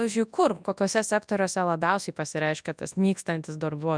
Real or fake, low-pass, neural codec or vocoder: fake; 9.9 kHz; codec, 24 kHz, 0.9 kbps, WavTokenizer, large speech release